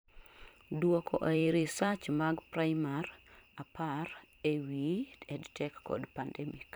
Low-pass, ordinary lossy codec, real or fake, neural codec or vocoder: none; none; fake; vocoder, 44.1 kHz, 128 mel bands every 512 samples, BigVGAN v2